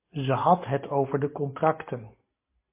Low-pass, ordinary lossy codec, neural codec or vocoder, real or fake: 3.6 kHz; MP3, 24 kbps; none; real